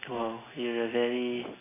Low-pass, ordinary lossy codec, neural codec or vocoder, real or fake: 3.6 kHz; AAC, 16 kbps; none; real